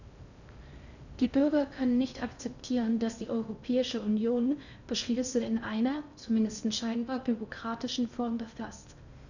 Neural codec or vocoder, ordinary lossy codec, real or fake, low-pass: codec, 16 kHz in and 24 kHz out, 0.6 kbps, FocalCodec, streaming, 4096 codes; none; fake; 7.2 kHz